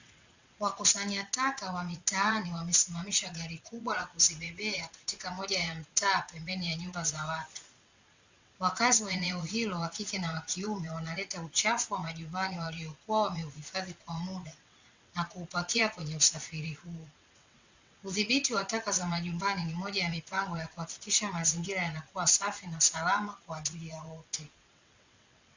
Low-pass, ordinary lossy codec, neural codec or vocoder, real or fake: 7.2 kHz; Opus, 64 kbps; vocoder, 22.05 kHz, 80 mel bands, WaveNeXt; fake